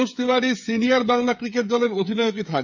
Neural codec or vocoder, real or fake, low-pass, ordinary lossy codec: codec, 16 kHz, 16 kbps, FreqCodec, smaller model; fake; 7.2 kHz; none